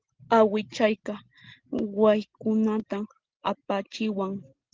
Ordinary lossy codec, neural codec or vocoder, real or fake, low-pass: Opus, 16 kbps; none; real; 7.2 kHz